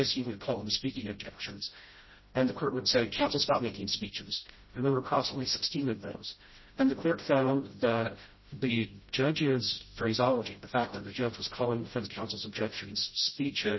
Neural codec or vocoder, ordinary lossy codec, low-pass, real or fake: codec, 16 kHz, 0.5 kbps, FreqCodec, smaller model; MP3, 24 kbps; 7.2 kHz; fake